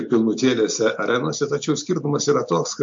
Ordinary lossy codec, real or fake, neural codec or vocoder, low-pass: MP3, 48 kbps; real; none; 7.2 kHz